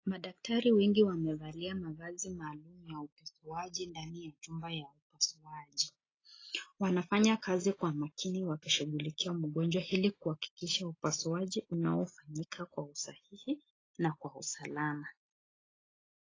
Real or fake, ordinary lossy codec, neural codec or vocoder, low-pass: real; AAC, 32 kbps; none; 7.2 kHz